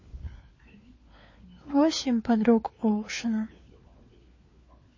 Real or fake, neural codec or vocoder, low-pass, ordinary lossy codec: fake; codec, 16 kHz, 4 kbps, FunCodec, trained on LibriTTS, 50 frames a second; 7.2 kHz; MP3, 32 kbps